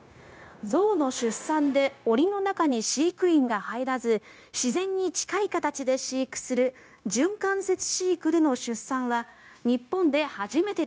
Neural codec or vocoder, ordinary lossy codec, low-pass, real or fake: codec, 16 kHz, 0.9 kbps, LongCat-Audio-Codec; none; none; fake